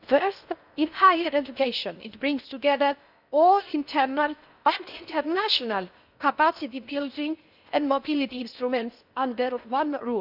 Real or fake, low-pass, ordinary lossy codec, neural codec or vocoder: fake; 5.4 kHz; none; codec, 16 kHz in and 24 kHz out, 0.6 kbps, FocalCodec, streaming, 4096 codes